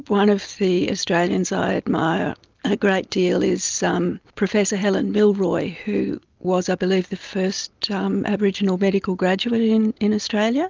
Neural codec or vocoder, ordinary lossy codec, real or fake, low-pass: none; Opus, 32 kbps; real; 7.2 kHz